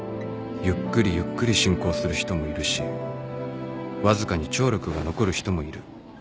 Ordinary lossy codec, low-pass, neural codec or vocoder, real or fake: none; none; none; real